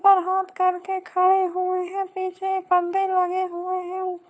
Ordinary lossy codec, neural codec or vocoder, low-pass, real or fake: none; codec, 16 kHz, 4 kbps, FunCodec, trained on LibriTTS, 50 frames a second; none; fake